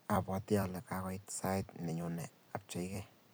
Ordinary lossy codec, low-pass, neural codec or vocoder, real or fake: none; none; vocoder, 44.1 kHz, 128 mel bands every 256 samples, BigVGAN v2; fake